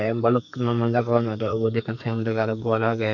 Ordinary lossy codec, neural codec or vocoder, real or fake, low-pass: none; codec, 44.1 kHz, 2.6 kbps, SNAC; fake; 7.2 kHz